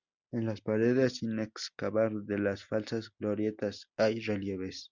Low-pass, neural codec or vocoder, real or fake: 7.2 kHz; none; real